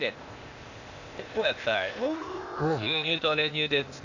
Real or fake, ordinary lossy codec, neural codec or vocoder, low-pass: fake; none; codec, 16 kHz, 0.8 kbps, ZipCodec; 7.2 kHz